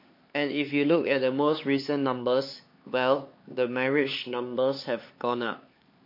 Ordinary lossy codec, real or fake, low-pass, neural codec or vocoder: MP3, 32 kbps; fake; 5.4 kHz; codec, 16 kHz, 4 kbps, X-Codec, HuBERT features, trained on LibriSpeech